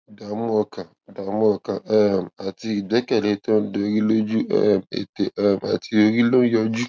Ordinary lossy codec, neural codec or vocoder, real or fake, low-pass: none; none; real; none